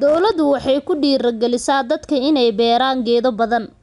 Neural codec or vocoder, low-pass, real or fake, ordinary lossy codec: none; 10.8 kHz; real; none